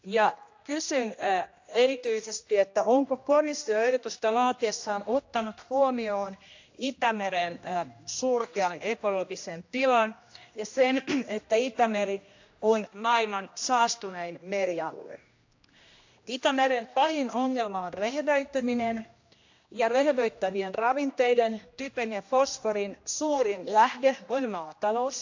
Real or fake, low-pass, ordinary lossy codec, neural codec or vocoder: fake; 7.2 kHz; AAC, 48 kbps; codec, 16 kHz, 1 kbps, X-Codec, HuBERT features, trained on general audio